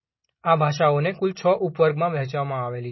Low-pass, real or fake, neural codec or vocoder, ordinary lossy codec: 7.2 kHz; real; none; MP3, 24 kbps